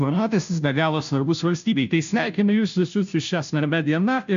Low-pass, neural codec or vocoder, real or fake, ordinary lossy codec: 7.2 kHz; codec, 16 kHz, 0.5 kbps, FunCodec, trained on Chinese and English, 25 frames a second; fake; MP3, 64 kbps